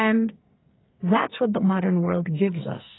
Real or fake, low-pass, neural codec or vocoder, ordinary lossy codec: fake; 7.2 kHz; codec, 44.1 kHz, 2.6 kbps, SNAC; AAC, 16 kbps